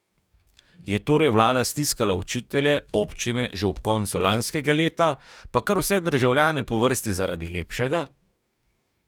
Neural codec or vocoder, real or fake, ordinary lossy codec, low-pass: codec, 44.1 kHz, 2.6 kbps, DAC; fake; none; 19.8 kHz